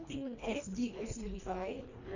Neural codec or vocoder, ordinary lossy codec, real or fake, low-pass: codec, 24 kHz, 1.5 kbps, HILCodec; none; fake; 7.2 kHz